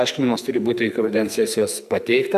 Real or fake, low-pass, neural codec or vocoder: fake; 14.4 kHz; codec, 44.1 kHz, 2.6 kbps, SNAC